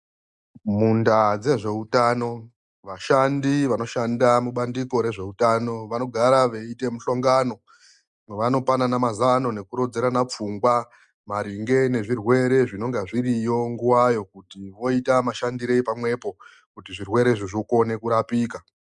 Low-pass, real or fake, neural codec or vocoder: 10.8 kHz; real; none